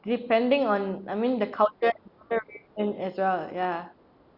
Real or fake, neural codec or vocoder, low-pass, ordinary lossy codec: real; none; 5.4 kHz; Opus, 64 kbps